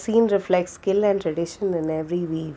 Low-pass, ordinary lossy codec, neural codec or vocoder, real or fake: none; none; none; real